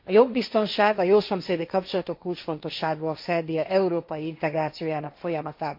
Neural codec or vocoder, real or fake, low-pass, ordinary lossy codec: codec, 16 kHz, 1.1 kbps, Voila-Tokenizer; fake; 5.4 kHz; MP3, 32 kbps